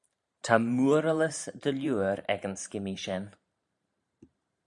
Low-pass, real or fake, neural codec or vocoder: 10.8 kHz; fake; vocoder, 44.1 kHz, 128 mel bands every 512 samples, BigVGAN v2